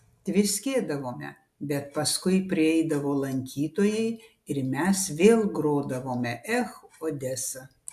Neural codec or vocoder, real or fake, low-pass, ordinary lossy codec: none; real; 14.4 kHz; AAC, 96 kbps